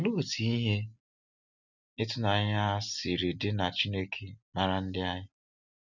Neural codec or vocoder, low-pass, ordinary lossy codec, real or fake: none; 7.2 kHz; none; real